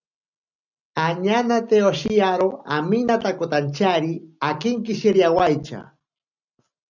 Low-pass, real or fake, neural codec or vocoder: 7.2 kHz; real; none